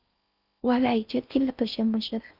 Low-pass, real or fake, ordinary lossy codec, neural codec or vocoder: 5.4 kHz; fake; Opus, 24 kbps; codec, 16 kHz in and 24 kHz out, 0.6 kbps, FocalCodec, streaming, 4096 codes